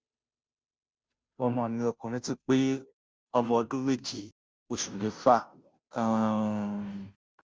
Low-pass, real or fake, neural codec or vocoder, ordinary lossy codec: none; fake; codec, 16 kHz, 0.5 kbps, FunCodec, trained on Chinese and English, 25 frames a second; none